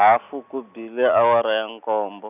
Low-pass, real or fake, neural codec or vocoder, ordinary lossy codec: 3.6 kHz; real; none; none